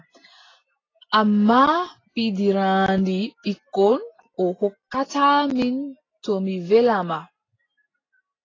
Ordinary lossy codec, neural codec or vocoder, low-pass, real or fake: AAC, 32 kbps; none; 7.2 kHz; real